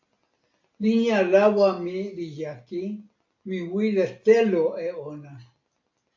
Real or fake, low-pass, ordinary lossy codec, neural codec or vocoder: real; 7.2 kHz; AAC, 48 kbps; none